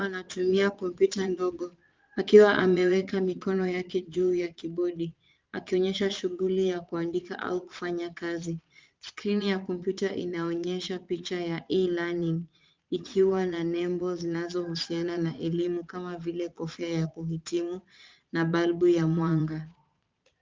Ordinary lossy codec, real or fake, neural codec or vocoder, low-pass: Opus, 24 kbps; fake; vocoder, 22.05 kHz, 80 mel bands, Vocos; 7.2 kHz